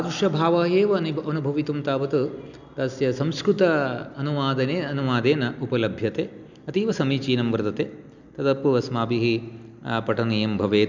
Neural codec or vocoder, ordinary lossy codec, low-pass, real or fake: none; none; 7.2 kHz; real